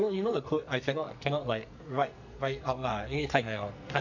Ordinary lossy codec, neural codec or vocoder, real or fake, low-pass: none; codec, 44.1 kHz, 2.6 kbps, SNAC; fake; 7.2 kHz